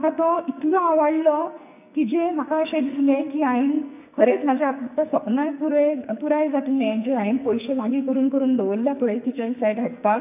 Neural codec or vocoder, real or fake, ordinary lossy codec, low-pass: codec, 44.1 kHz, 2.6 kbps, SNAC; fake; none; 3.6 kHz